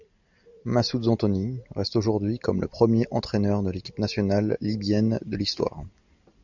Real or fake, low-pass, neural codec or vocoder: real; 7.2 kHz; none